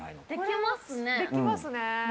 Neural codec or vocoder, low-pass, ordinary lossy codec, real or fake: none; none; none; real